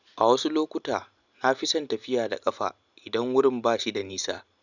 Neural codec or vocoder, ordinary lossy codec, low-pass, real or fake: none; none; 7.2 kHz; real